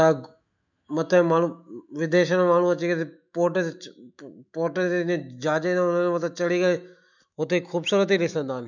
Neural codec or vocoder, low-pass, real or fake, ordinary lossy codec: autoencoder, 48 kHz, 128 numbers a frame, DAC-VAE, trained on Japanese speech; 7.2 kHz; fake; none